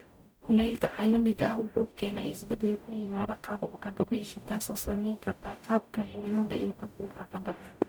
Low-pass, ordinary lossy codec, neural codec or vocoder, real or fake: none; none; codec, 44.1 kHz, 0.9 kbps, DAC; fake